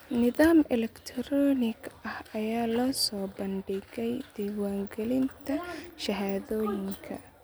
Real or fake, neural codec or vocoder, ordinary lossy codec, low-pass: real; none; none; none